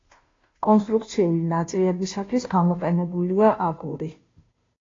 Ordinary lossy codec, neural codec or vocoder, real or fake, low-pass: AAC, 32 kbps; codec, 16 kHz, 0.5 kbps, FunCodec, trained on Chinese and English, 25 frames a second; fake; 7.2 kHz